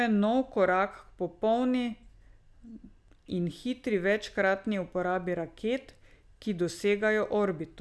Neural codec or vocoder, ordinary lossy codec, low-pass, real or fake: none; none; none; real